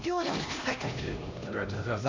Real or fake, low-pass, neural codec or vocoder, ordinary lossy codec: fake; 7.2 kHz; codec, 16 kHz, 1 kbps, X-Codec, HuBERT features, trained on LibriSpeech; MP3, 64 kbps